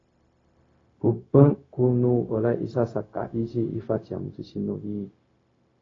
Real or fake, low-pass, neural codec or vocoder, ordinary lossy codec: fake; 7.2 kHz; codec, 16 kHz, 0.4 kbps, LongCat-Audio-Codec; AAC, 32 kbps